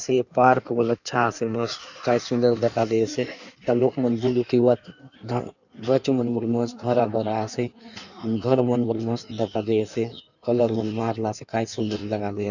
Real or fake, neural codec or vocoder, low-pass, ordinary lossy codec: fake; codec, 16 kHz in and 24 kHz out, 1.1 kbps, FireRedTTS-2 codec; 7.2 kHz; none